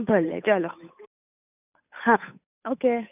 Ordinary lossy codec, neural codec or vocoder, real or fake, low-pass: none; codec, 16 kHz, 8 kbps, FunCodec, trained on Chinese and English, 25 frames a second; fake; 3.6 kHz